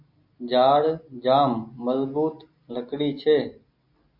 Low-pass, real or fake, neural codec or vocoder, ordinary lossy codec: 5.4 kHz; real; none; MP3, 32 kbps